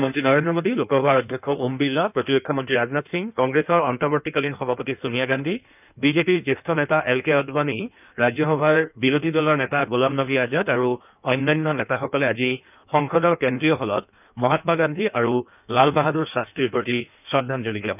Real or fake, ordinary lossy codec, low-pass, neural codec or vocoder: fake; none; 3.6 kHz; codec, 16 kHz in and 24 kHz out, 1.1 kbps, FireRedTTS-2 codec